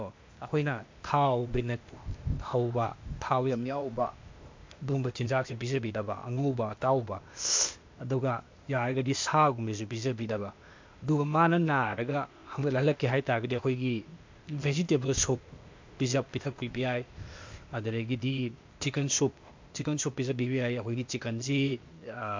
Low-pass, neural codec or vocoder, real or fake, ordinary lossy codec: 7.2 kHz; codec, 16 kHz, 0.8 kbps, ZipCodec; fake; MP3, 64 kbps